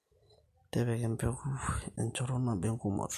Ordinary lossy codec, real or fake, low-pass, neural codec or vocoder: MP3, 64 kbps; real; 14.4 kHz; none